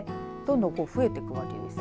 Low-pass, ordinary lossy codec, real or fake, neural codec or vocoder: none; none; real; none